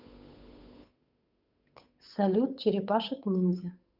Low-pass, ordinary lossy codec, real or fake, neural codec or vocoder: 5.4 kHz; none; fake; codec, 16 kHz, 8 kbps, FunCodec, trained on Chinese and English, 25 frames a second